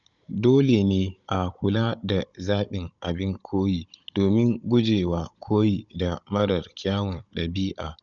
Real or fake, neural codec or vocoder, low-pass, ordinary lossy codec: fake; codec, 16 kHz, 16 kbps, FunCodec, trained on Chinese and English, 50 frames a second; 7.2 kHz; none